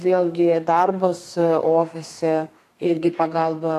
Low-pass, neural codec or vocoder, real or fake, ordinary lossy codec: 14.4 kHz; codec, 32 kHz, 1.9 kbps, SNAC; fake; AAC, 64 kbps